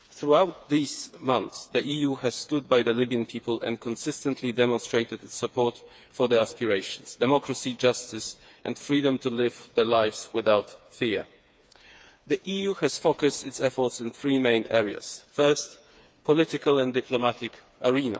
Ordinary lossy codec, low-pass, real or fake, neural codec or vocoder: none; none; fake; codec, 16 kHz, 4 kbps, FreqCodec, smaller model